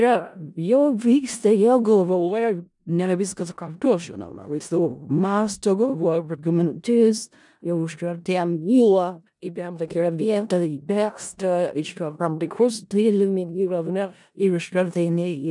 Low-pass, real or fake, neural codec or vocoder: 10.8 kHz; fake; codec, 16 kHz in and 24 kHz out, 0.4 kbps, LongCat-Audio-Codec, four codebook decoder